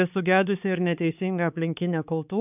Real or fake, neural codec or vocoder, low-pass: fake; codec, 16 kHz, 4 kbps, X-Codec, HuBERT features, trained on balanced general audio; 3.6 kHz